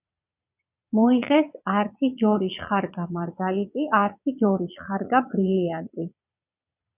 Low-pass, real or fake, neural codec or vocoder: 3.6 kHz; fake; vocoder, 22.05 kHz, 80 mel bands, Vocos